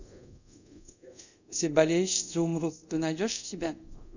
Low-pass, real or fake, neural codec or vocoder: 7.2 kHz; fake; codec, 24 kHz, 0.5 kbps, DualCodec